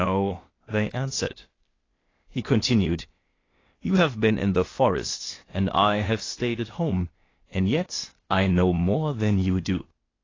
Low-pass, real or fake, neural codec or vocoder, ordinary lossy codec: 7.2 kHz; fake; codec, 16 kHz, 0.8 kbps, ZipCodec; AAC, 32 kbps